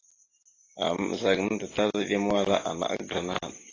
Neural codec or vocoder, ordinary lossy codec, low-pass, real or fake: none; AAC, 32 kbps; 7.2 kHz; real